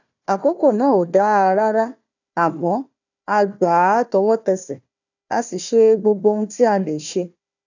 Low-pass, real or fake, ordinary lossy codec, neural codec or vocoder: 7.2 kHz; fake; none; codec, 16 kHz, 1 kbps, FunCodec, trained on Chinese and English, 50 frames a second